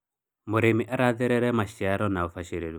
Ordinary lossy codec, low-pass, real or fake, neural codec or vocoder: none; none; real; none